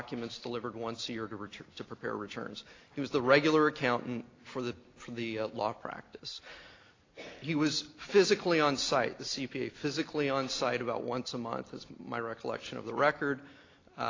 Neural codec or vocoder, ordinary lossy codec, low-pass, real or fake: none; AAC, 32 kbps; 7.2 kHz; real